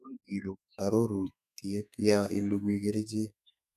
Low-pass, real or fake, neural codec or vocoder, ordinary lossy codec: 14.4 kHz; fake; codec, 32 kHz, 1.9 kbps, SNAC; none